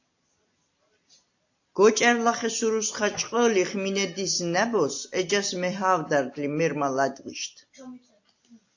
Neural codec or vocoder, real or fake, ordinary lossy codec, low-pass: none; real; AAC, 48 kbps; 7.2 kHz